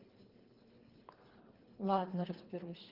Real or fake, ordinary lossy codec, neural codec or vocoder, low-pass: fake; Opus, 16 kbps; codec, 24 kHz, 3 kbps, HILCodec; 5.4 kHz